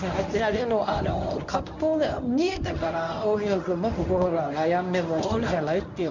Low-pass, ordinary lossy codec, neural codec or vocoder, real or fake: 7.2 kHz; none; codec, 24 kHz, 0.9 kbps, WavTokenizer, medium speech release version 1; fake